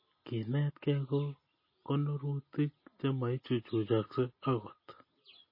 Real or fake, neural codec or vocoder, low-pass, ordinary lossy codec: real; none; 5.4 kHz; MP3, 24 kbps